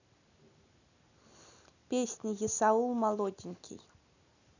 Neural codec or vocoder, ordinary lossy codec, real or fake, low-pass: none; none; real; 7.2 kHz